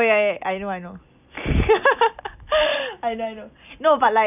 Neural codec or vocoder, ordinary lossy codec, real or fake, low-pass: none; none; real; 3.6 kHz